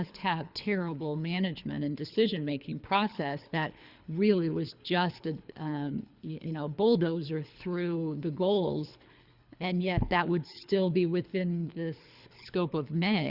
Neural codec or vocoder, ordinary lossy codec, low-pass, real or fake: codec, 24 kHz, 3 kbps, HILCodec; Opus, 64 kbps; 5.4 kHz; fake